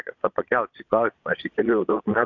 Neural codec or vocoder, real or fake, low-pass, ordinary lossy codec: vocoder, 22.05 kHz, 80 mel bands, Vocos; fake; 7.2 kHz; AAC, 48 kbps